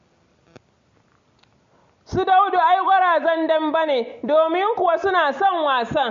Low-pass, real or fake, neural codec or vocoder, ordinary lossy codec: 7.2 kHz; real; none; MP3, 48 kbps